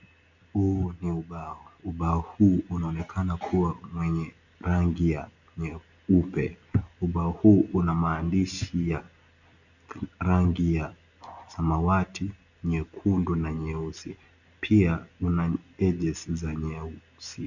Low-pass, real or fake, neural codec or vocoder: 7.2 kHz; real; none